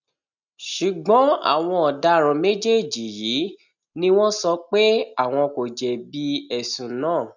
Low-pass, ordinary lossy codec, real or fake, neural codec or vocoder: 7.2 kHz; none; real; none